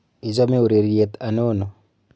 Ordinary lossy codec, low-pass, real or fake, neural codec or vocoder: none; none; real; none